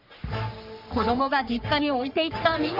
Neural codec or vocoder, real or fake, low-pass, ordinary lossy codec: codec, 44.1 kHz, 3.4 kbps, Pupu-Codec; fake; 5.4 kHz; none